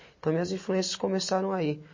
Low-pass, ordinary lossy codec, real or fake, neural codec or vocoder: 7.2 kHz; MP3, 32 kbps; real; none